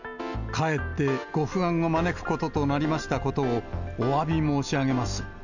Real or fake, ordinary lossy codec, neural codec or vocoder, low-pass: real; none; none; 7.2 kHz